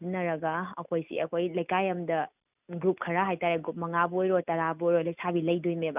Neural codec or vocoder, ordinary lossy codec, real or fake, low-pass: none; none; real; 3.6 kHz